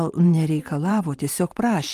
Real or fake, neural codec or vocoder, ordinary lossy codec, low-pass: fake; vocoder, 44.1 kHz, 128 mel bands every 512 samples, BigVGAN v2; Opus, 32 kbps; 14.4 kHz